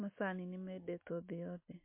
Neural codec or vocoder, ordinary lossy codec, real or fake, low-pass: none; MP3, 24 kbps; real; 3.6 kHz